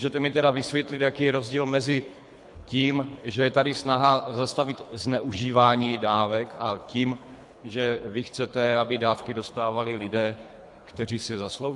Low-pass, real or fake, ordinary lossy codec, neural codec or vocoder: 10.8 kHz; fake; AAC, 64 kbps; codec, 24 kHz, 3 kbps, HILCodec